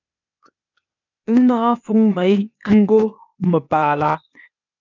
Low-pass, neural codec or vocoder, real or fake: 7.2 kHz; codec, 16 kHz, 0.8 kbps, ZipCodec; fake